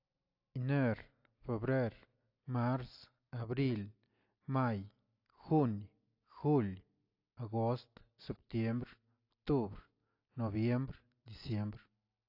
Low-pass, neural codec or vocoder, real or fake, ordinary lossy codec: 5.4 kHz; none; real; AAC, 32 kbps